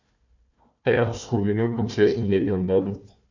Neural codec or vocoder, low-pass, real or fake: codec, 16 kHz, 1 kbps, FunCodec, trained on Chinese and English, 50 frames a second; 7.2 kHz; fake